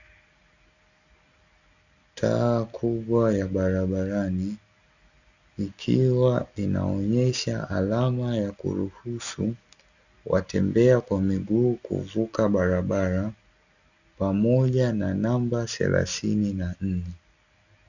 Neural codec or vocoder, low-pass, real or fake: none; 7.2 kHz; real